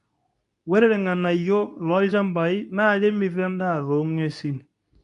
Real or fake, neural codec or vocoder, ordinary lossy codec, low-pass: fake; codec, 24 kHz, 0.9 kbps, WavTokenizer, medium speech release version 2; none; 10.8 kHz